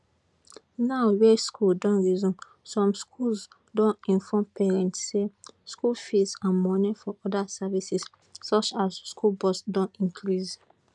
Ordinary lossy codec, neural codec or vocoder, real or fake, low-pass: none; none; real; 10.8 kHz